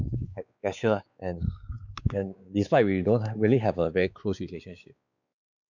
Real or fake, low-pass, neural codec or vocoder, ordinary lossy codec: fake; 7.2 kHz; codec, 16 kHz, 4 kbps, X-Codec, WavLM features, trained on Multilingual LibriSpeech; none